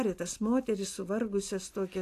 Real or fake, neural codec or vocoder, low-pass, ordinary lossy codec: real; none; 14.4 kHz; AAC, 64 kbps